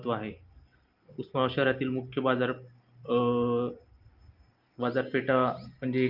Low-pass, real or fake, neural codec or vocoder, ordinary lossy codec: 5.4 kHz; real; none; Opus, 32 kbps